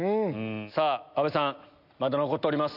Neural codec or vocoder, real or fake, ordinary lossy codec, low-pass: none; real; none; 5.4 kHz